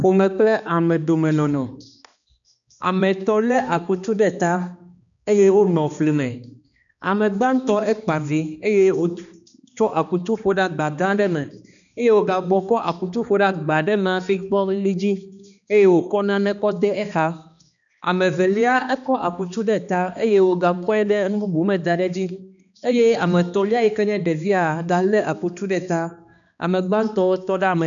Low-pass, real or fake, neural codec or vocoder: 7.2 kHz; fake; codec, 16 kHz, 2 kbps, X-Codec, HuBERT features, trained on balanced general audio